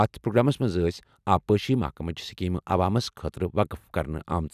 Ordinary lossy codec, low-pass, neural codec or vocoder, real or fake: none; 14.4 kHz; none; real